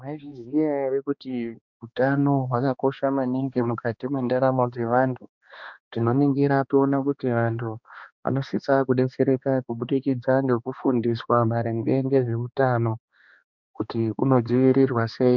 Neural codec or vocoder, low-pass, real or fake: codec, 16 kHz, 2 kbps, X-Codec, HuBERT features, trained on balanced general audio; 7.2 kHz; fake